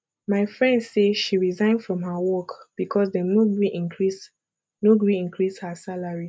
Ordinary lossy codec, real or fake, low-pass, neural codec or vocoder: none; real; none; none